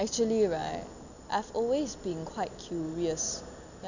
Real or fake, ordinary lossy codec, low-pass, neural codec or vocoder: real; none; 7.2 kHz; none